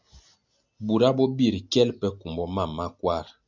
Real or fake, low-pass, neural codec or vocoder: real; 7.2 kHz; none